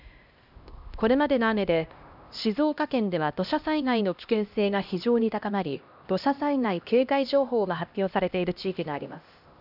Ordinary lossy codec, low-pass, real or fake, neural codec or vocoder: none; 5.4 kHz; fake; codec, 16 kHz, 1 kbps, X-Codec, HuBERT features, trained on LibriSpeech